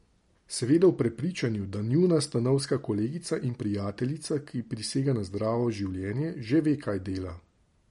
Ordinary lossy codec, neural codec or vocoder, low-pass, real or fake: MP3, 48 kbps; none; 19.8 kHz; real